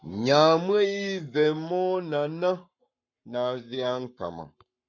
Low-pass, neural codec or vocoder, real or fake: 7.2 kHz; codec, 44.1 kHz, 7.8 kbps, Pupu-Codec; fake